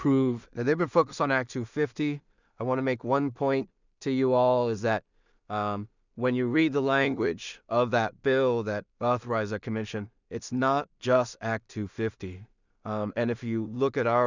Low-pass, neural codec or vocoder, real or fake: 7.2 kHz; codec, 16 kHz in and 24 kHz out, 0.4 kbps, LongCat-Audio-Codec, two codebook decoder; fake